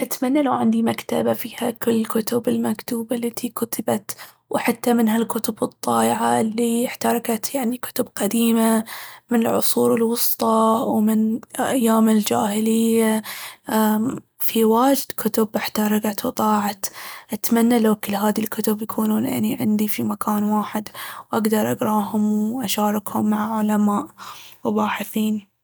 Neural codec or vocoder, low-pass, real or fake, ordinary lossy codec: none; none; real; none